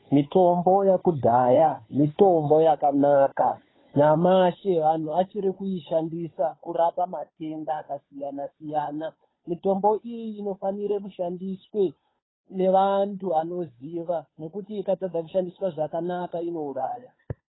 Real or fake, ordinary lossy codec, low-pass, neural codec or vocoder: fake; AAC, 16 kbps; 7.2 kHz; codec, 16 kHz, 2 kbps, FunCodec, trained on Chinese and English, 25 frames a second